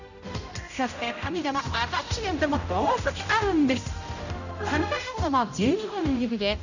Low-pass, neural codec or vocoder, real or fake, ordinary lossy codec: 7.2 kHz; codec, 16 kHz, 0.5 kbps, X-Codec, HuBERT features, trained on general audio; fake; none